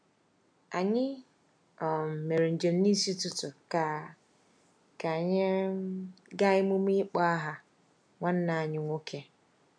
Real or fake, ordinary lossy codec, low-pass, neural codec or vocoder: real; none; 9.9 kHz; none